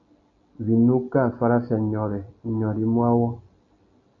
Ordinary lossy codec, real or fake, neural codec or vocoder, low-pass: AAC, 64 kbps; real; none; 7.2 kHz